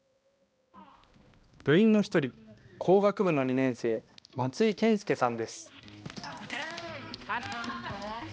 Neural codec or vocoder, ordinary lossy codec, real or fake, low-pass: codec, 16 kHz, 1 kbps, X-Codec, HuBERT features, trained on balanced general audio; none; fake; none